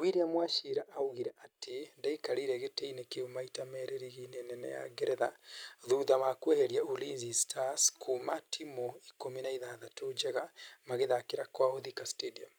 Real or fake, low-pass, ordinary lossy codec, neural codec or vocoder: real; none; none; none